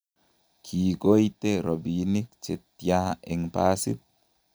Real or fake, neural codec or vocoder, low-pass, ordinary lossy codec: real; none; none; none